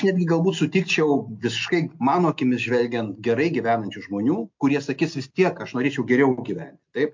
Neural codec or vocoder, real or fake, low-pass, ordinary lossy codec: none; real; 7.2 kHz; MP3, 48 kbps